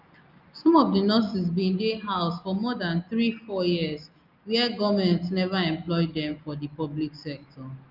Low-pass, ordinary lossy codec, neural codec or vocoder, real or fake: 5.4 kHz; Opus, 24 kbps; none; real